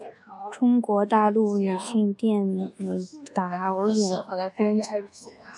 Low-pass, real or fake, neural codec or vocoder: 10.8 kHz; fake; codec, 24 kHz, 1.2 kbps, DualCodec